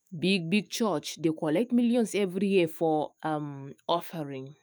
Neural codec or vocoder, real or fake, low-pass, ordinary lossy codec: autoencoder, 48 kHz, 128 numbers a frame, DAC-VAE, trained on Japanese speech; fake; none; none